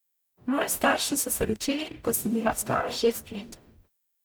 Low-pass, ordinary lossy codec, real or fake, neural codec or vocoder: none; none; fake; codec, 44.1 kHz, 0.9 kbps, DAC